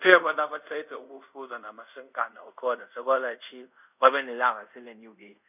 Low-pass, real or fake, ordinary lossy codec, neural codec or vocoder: 3.6 kHz; fake; none; codec, 24 kHz, 0.5 kbps, DualCodec